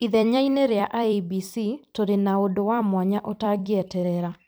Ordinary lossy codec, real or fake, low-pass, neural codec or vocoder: none; fake; none; vocoder, 44.1 kHz, 128 mel bands every 256 samples, BigVGAN v2